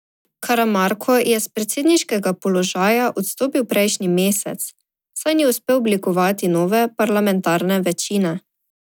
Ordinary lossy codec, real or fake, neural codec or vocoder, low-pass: none; real; none; none